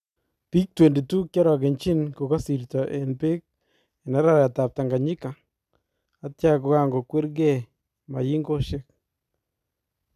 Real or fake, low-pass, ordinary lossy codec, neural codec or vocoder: real; 14.4 kHz; none; none